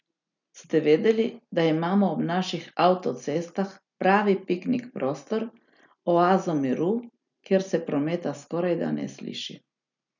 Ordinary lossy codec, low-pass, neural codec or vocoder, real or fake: none; 7.2 kHz; none; real